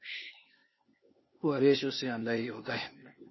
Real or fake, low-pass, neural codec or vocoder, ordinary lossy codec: fake; 7.2 kHz; codec, 16 kHz, 0.8 kbps, ZipCodec; MP3, 24 kbps